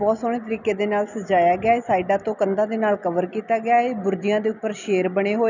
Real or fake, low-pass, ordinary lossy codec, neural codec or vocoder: real; 7.2 kHz; none; none